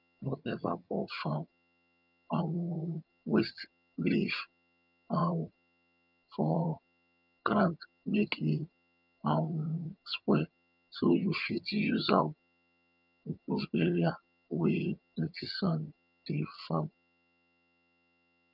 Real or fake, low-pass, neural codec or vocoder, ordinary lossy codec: fake; 5.4 kHz; vocoder, 22.05 kHz, 80 mel bands, HiFi-GAN; none